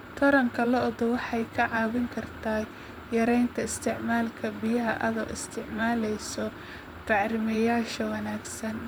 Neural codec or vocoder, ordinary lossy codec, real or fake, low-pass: vocoder, 44.1 kHz, 128 mel bands, Pupu-Vocoder; none; fake; none